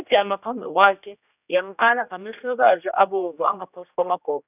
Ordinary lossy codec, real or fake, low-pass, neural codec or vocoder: none; fake; 3.6 kHz; codec, 16 kHz, 1 kbps, X-Codec, HuBERT features, trained on general audio